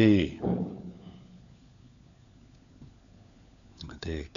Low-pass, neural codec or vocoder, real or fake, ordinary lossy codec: 7.2 kHz; codec, 16 kHz, 4 kbps, FunCodec, trained on LibriTTS, 50 frames a second; fake; none